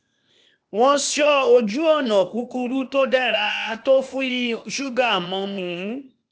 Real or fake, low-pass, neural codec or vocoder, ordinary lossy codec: fake; none; codec, 16 kHz, 0.8 kbps, ZipCodec; none